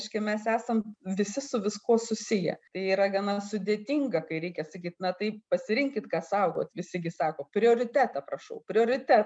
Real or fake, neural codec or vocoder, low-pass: real; none; 9.9 kHz